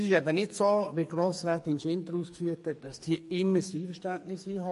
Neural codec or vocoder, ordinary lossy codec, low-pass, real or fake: codec, 44.1 kHz, 2.6 kbps, SNAC; MP3, 48 kbps; 14.4 kHz; fake